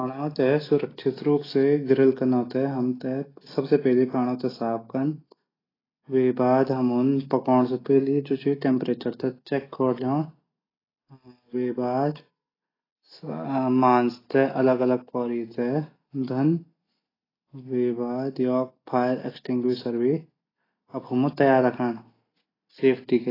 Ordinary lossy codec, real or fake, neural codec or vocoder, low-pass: AAC, 24 kbps; real; none; 5.4 kHz